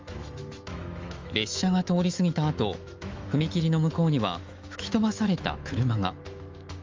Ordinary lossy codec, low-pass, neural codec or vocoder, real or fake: Opus, 32 kbps; 7.2 kHz; autoencoder, 48 kHz, 128 numbers a frame, DAC-VAE, trained on Japanese speech; fake